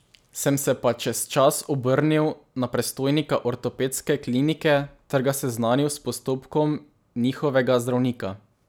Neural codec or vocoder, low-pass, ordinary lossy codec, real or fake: none; none; none; real